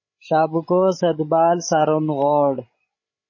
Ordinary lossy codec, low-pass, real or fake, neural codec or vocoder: MP3, 32 kbps; 7.2 kHz; fake; codec, 16 kHz, 16 kbps, FreqCodec, larger model